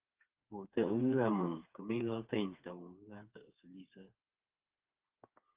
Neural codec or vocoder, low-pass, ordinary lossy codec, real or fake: codec, 16 kHz, 4 kbps, FreqCodec, smaller model; 3.6 kHz; Opus, 24 kbps; fake